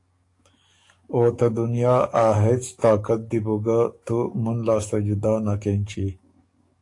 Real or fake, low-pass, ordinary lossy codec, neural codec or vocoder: fake; 10.8 kHz; AAC, 48 kbps; codec, 44.1 kHz, 7.8 kbps, DAC